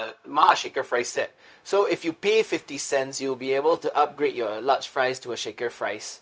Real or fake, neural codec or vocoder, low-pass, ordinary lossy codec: fake; codec, 16 kHz, 0.4 kbps, LongCat-Audio-Codec; none; none